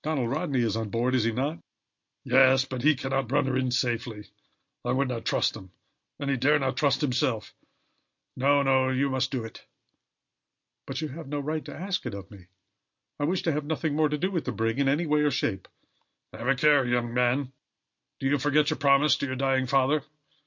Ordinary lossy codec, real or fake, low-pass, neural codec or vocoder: MP3, 48 kbps; real; 7.2 kHz; none